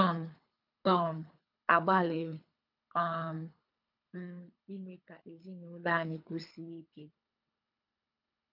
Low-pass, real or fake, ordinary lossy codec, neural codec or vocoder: 5.4 kHz; fake; none; codec, 24 kHz, 3 kbps, HILCodec